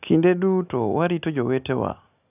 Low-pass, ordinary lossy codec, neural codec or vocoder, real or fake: 3.6 kHz; none; none; real